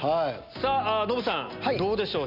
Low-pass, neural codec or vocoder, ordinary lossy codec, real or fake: 5.4 kHz; none; none; real